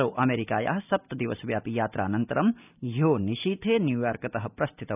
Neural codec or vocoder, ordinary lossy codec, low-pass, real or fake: none; none; 3.6 kHz; real